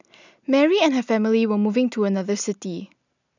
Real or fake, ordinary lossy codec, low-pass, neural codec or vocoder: real; none; 7.2 kHz; none